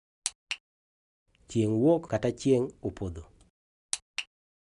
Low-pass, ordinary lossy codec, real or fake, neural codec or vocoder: 10.8 kHz; none; real; none